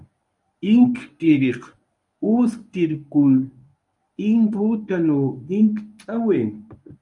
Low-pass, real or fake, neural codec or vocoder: 10.8 kHz; fake; codec, 24 kHz, 0.9 kbps, WavTokenizer, medium speech release version 1